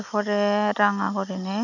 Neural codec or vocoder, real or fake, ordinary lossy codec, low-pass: none; real; none; 7.2 kHz